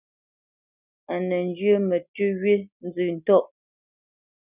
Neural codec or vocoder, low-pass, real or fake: none; 3.6 kHz; real